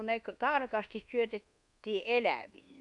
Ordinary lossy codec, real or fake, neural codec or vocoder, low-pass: none; fake; codec, 24 kHz, 1.2 kbps, DualCodec; 10.8 kHz